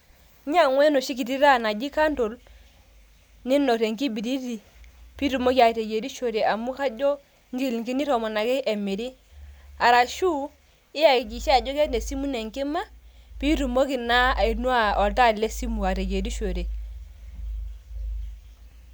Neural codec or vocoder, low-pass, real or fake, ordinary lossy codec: none; none; real; none